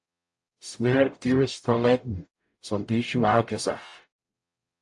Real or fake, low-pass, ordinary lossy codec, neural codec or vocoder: fake; 10.8 kHz; AAC, 64 kbps; codec, 44.1 kHz, 0.9 kbps, DAC